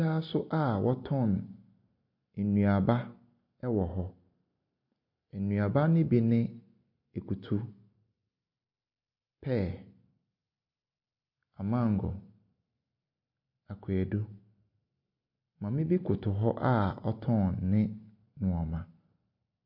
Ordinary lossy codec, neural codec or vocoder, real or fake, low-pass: MP3, 32 kbps; none; real; 5.4 kHz